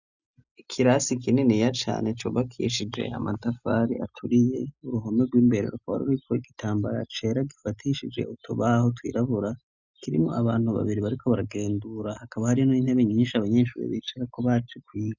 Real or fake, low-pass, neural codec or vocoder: real; 7.2 kHz; none